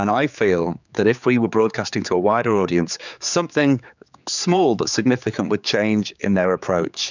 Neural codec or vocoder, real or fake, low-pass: codec, 16 kHz, 4 kbps, X-Codec, HuBERT features, trained on general audio; fake; 7.2 kHz